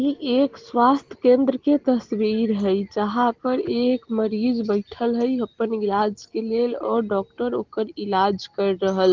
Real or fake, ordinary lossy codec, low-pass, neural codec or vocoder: real; Opus, 16 kbps; 7.2 kHz; none